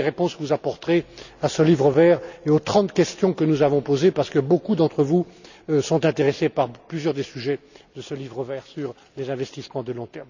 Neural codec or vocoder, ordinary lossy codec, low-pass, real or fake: none; none; 7.2 kHz; real